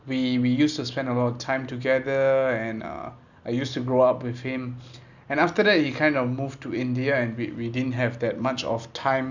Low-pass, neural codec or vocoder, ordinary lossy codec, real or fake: 7.2 kHz; none; none; real